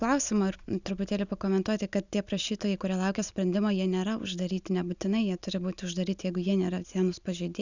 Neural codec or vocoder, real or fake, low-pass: none; real; 7.2 kHz